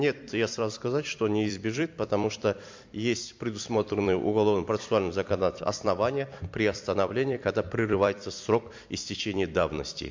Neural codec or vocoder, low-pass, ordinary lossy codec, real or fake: vocoder, 44.1 kHz, 128 mel bands every 256 samples, BigVGAN v2; 7.2 kHz; MP3, 48 kbps; fake